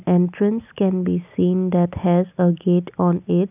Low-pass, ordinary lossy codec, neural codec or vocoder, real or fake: 3.6 kHz; none; none; real